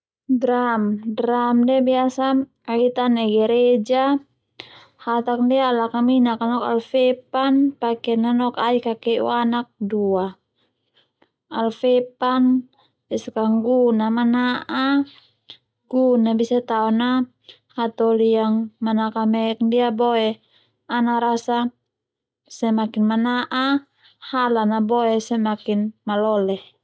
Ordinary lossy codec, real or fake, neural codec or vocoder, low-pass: none; real; none; none